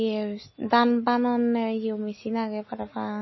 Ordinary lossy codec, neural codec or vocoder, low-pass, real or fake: MP3, 24 kbps; none; 7.2 kHz; real